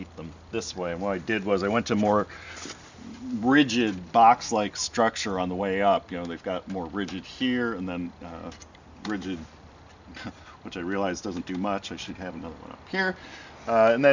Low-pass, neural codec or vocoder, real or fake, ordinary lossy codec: 7.2 kHz; none; real; Opus, 64 kbps